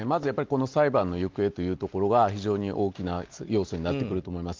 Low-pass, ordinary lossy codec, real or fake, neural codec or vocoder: 7.2 kHz; Opus, 32 kbps; real; none